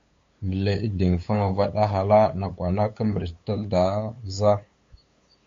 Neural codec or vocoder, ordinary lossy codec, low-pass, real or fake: codec, 16 kHz, 8 kbps, FunCodec, trained on LibriTTS, 25 frames a second; AAC, 48 kbps; 7.2 kHz; fake